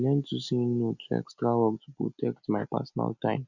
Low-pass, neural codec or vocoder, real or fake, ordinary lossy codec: 7.2 kHz; none; real; none